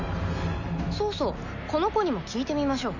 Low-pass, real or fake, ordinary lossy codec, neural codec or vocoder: 7.2 kHz; real; none; none